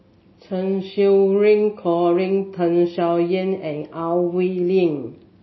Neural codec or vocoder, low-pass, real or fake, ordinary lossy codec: none; 7.2 kHz; real; MP3, 24 kbps